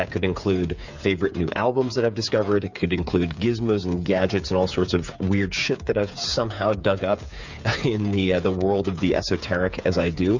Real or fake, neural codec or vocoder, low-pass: fake; codec, 16 kHz, 8 kbps, FreqCodec, smaller model; 7.2 kHz